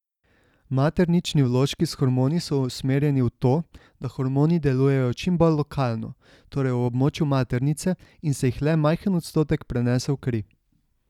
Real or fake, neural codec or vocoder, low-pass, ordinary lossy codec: real; none; 19.8 kHz; none